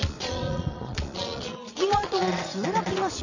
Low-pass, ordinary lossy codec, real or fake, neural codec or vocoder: 7.2 kHz; none; fake; vocoder, 22.05 kHz, 80 mel bands, Vocos